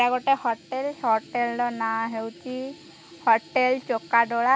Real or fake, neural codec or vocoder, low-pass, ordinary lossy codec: real; none; none; none